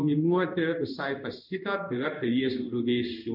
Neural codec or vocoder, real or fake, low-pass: codec, 16 kHz in and 24 kHz out, 1 kbps, XY-Tokenizer; fake; 5.4 kHz